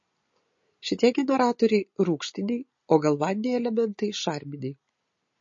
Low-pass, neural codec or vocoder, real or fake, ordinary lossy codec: 7.2 kHz; none; real; MP3, 32 kbps